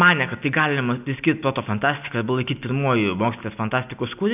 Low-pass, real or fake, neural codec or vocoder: 3.6 kHz; real; none